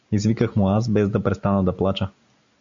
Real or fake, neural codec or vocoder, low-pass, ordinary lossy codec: real; none; 7.2 kHz; MP3, 64 kbps